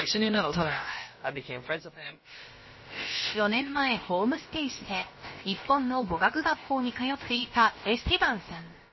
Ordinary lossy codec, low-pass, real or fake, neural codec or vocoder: MP3, 24 kbps; 7.2 kHz; fake; codec, 16 kHz, about 1 kbps, DyCAST, with the encoder's durations